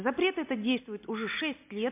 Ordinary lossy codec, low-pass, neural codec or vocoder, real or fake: MP3, 32 kbps; 3.6 kHz; none; real